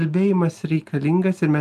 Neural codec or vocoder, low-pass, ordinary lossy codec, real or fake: none; 14.4 kHz; Opus, 32 kbps; real